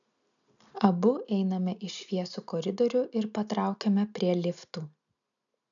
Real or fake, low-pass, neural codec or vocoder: real; 7.2 kHz; none